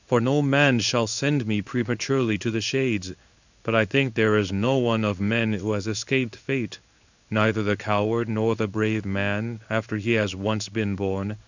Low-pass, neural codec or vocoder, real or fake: 7.2 kHz; codec, 16 kHz in and 24 kHz out, 1 kbps, XY-Tokenizer; fake